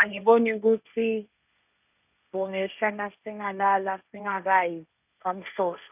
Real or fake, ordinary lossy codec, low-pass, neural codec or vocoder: fake; none; 3.6 kHz; codec, 16 kHz, 1.1 kbps, Voila-Tokenizer